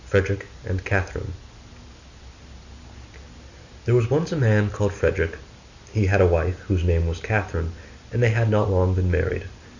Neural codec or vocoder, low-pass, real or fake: none; 7.2 kHz; real